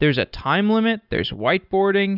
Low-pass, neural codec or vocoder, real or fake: 5.4 kHz; none; real